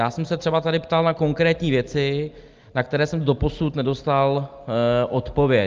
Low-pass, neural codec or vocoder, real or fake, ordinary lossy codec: 7.2 kHz; none; real; Opus, 24 kbps